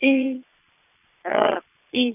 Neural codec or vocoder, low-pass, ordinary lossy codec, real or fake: vocoder, 22.05 kHz, 80 mel bands, Vocos; 3.6 kHz; none; fake